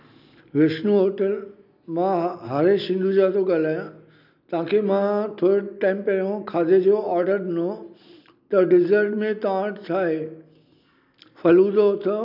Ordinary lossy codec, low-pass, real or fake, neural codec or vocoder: none; 5.4 kHz; real; none